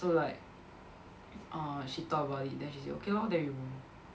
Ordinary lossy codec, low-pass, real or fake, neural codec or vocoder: none; none; real; none